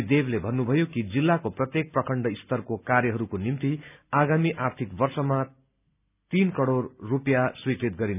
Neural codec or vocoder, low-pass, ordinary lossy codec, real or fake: none; 3.6 kHz; none; real